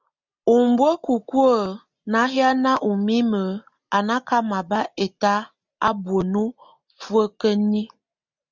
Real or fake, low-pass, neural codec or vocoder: real; 7.2 kHz; none